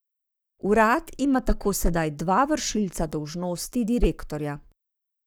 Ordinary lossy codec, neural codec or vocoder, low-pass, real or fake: none; codec, 44.1 kHz, 7.8 kbps, Pupu-Codec; none; fake